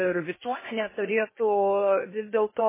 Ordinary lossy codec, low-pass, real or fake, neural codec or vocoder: MP3, 16 kbps; 3.6 kHz; fake; codec, 16 kHz in and 24 kHz out, 0.8 kbps, FocalCodec, streaming, 65536 codes